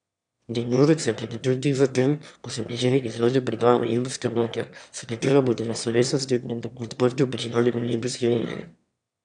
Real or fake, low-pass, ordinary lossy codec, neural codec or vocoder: fake; 9.9 kHz; none; autoencoder, 22.05 kHz, a latent of 192 numbers a frame, VITS, trained on one speaker